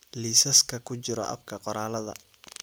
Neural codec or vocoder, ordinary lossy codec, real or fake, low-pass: none; none; real; none